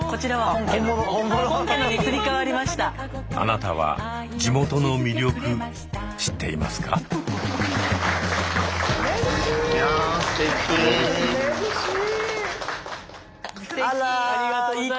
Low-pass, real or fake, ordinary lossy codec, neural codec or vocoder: none; real; none; none